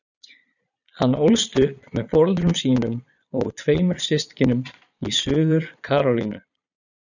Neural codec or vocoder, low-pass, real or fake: vocoder, 22.05 kHz, 80 mel bands, Vocos; 7.2 kHz; fake